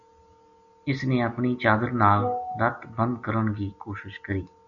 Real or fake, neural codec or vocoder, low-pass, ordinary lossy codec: real; none; 7.2 kHz; AAC, 64 kbps